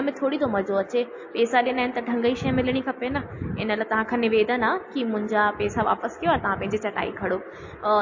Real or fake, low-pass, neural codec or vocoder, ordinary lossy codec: real; 7.2 kHz; none; MP3, 32 kbps